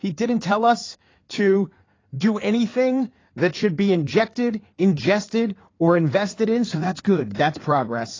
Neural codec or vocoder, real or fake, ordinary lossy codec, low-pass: codec, 16 kHz in and 24 kHz out, 2.2 kbps, FireRedTTS-2 codec; fake; AAC, 32 kbps; 7.2 kHz